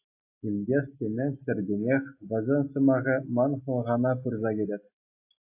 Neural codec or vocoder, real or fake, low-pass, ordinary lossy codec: none; real; 3.6 kHz; AAC, 32 kbps